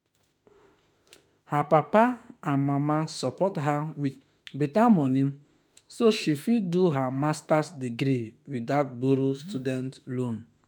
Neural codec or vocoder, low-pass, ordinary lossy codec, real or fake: autoencoder, 48 kHz, 32 numbers a frame, DAC-VAE, trained on Japanese speech; 19.8 kHz; none; fake